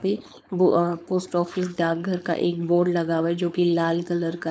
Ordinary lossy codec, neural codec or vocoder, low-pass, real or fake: none; codec, 16 kHz, 4.8 kbps, FACodec; none; fake